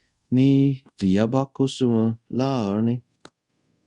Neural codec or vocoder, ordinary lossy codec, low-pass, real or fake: codec, 24 kHz, 0.5 kbps, DualCodec; Opus, 64 kbps; 10.8 kHz; fake